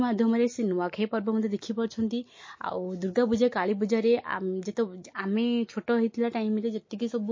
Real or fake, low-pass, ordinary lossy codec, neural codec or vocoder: real; 7.2 kHz; MP3, 32 kbps; none